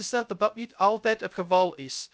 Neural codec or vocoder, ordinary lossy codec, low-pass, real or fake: codec, 16 kHz, 0.3 kbps, FocalCodec; none; none; fake